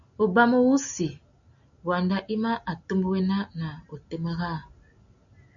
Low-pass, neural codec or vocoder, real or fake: 7.2 kHz; none; real